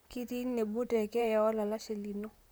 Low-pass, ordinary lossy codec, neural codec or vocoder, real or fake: none; none; vocoder, 44.1 kHz, 128 mel bands, Pupu-Vocoder; fake